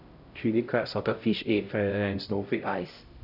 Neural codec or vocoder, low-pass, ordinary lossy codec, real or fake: codec, 16 kHz, 0.5 kbps, X-Codec, HuBERT features, trained on LibriSpeech; 5.4 kHz; none; fake